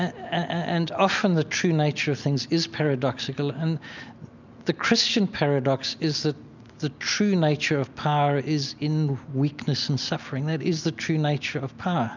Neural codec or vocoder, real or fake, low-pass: none; real; 7.2 kHz